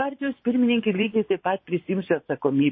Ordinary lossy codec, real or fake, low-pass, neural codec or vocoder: MP3, 24 kbps; fake; 7.2 kHz; vocoder, 24 kHz, 100 mel bands, Vocos